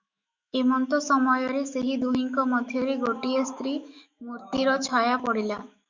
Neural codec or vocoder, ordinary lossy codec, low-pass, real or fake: autoencoder, 48 kHz, 128 numbers a frame, DAC-VAE, trained on Japanese speech; Opus, 64 kbps; 7.2 kHz; fake